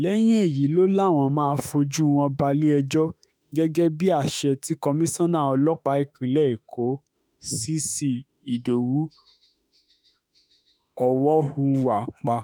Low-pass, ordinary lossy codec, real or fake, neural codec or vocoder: none; none; fake; autoencoder, 48 kHz, 32 numbers a frame, DAC-VAE, trained on Japanese speech